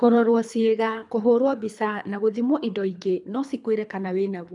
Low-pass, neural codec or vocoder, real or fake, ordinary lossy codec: 10.8 kHz; codec, 24 kHz, 3 kbps, HILCodec; fake; none